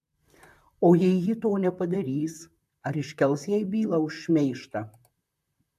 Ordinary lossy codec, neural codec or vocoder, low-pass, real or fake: AAC, 96 kbps; vocoder, 44.1 kHz, 128 mel bands, Pupu-Vocoder; 14.4 kHz; fake